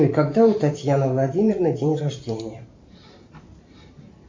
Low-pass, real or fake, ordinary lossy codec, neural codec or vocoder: 7.2 kHz; fake; MP3, 48 kbps; vocoder, 44.1 kHz, 128 mel bands every 512 samples, BigVGAN v2